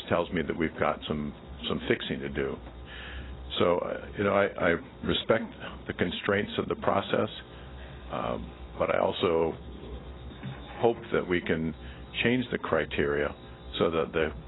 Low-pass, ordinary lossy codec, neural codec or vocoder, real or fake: 7.2 kHz; AAC, 16 kbps; none; real